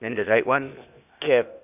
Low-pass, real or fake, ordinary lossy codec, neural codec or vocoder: 3.6 kHz; fake; none; codec, 16 kHz, 0.8 kbps, ZipCodec